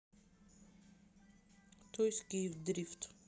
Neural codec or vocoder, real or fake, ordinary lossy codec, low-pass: none; real; none; none